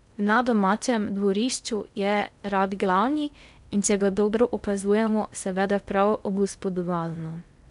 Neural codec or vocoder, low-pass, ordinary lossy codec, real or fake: codec, 16 kHz in and 24 kHz out, 0.6 kbps, FocalCodec, streaming, 2048 codes; 10.8 kHz; none; fake